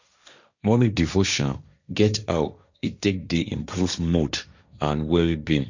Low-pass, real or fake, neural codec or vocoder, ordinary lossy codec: 7.2 kHz; fake; codec, 16 kHz, 1.1 kbps, Voila-Tokenizer; none